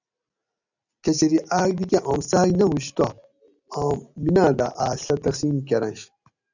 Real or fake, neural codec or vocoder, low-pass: real; none; 7.2 kHz